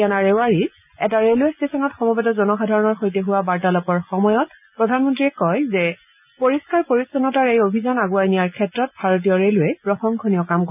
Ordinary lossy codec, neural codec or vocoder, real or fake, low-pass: none; none; real; 3.6 kHz